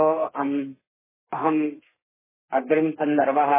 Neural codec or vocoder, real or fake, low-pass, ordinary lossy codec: codec, 32 kHz, 1.9 kbps, SNAC; fake; 3.6 kHz; MP3, 16 kbps